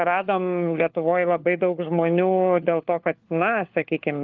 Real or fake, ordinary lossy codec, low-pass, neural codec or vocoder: fake; Opus, 24 kbps; 7.2 kHz; codec, 16 kHz, 4 kbps, FunCodec, trained on LibriTTS, 50 frames a second